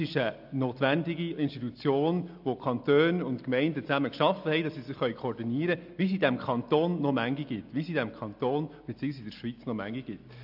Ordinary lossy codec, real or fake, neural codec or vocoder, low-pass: AAC, 48 kbps; real; none; 5.4 kHz